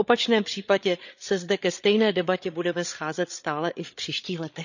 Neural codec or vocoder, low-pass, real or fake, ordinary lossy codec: codec, 16 kHz, 8 kbps, FreqCodec, larger model; 7.2 kHz; fake; none